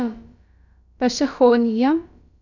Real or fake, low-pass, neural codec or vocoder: fake; 7.2 kHz; codec, 16 kHz, about 1 kbps, DyCAST, with the encoder's durations